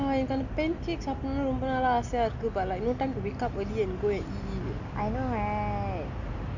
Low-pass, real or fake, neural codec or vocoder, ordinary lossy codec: 7.2 kHz; real; none; none